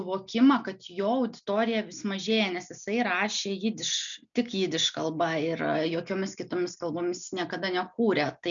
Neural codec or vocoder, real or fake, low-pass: none; real; 7.2 kHz